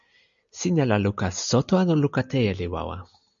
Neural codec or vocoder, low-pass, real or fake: none; 7.2 kHz; real